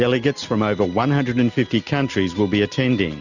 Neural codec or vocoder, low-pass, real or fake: none; 7.2 kHz; real